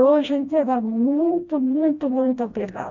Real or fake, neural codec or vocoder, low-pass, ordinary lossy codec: fake; codec, 16 kHz, 1 kbps, FreqCodec, smaller model; 7.2 kHz; none